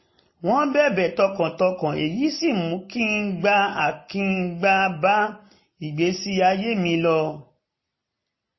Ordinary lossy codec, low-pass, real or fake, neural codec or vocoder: MP3, 24 kbps; 7.2 kHz; real; none